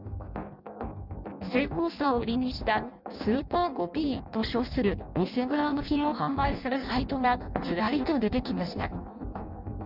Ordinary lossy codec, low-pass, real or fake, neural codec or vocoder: none; 5.4 kHz; fake; codec, 16 kHz in and 24 kHz out, 0.6 kbps, FireRedTTS-2 codec